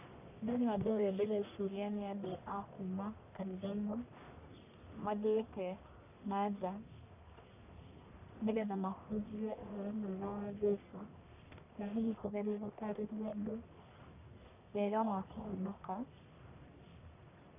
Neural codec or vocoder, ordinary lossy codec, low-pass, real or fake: codec, 44.1 kHz, 1.7 kbps, Pupu-Codec; none; 3.6 kHz; fake